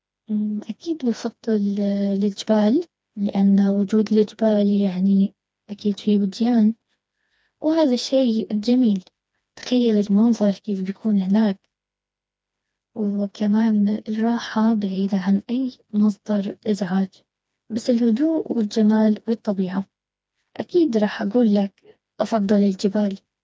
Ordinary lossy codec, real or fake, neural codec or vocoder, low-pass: none; fake; codec, 16 kHz, 2 kbps, FreqCodec, smaller model; none